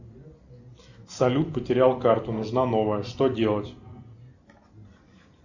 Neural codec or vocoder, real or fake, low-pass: none; real; 7.2 kHz